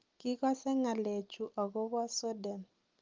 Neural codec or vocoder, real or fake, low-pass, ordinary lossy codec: none; real; 7.2 kHz; Opus, 24 kbps